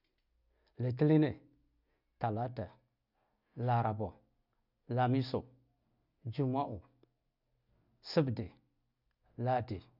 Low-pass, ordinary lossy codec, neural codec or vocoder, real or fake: 5.4 kHz; none; none; real